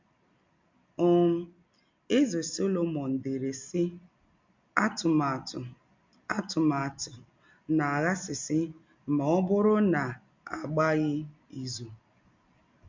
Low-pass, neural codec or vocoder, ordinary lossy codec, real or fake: 7.2 kHz; none; MP3, 64 kbps; real